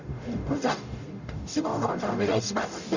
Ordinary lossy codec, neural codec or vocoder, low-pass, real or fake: none; codec, 44.1 kHz, 0.9 kbps, DAC; 7.2 kHz; fake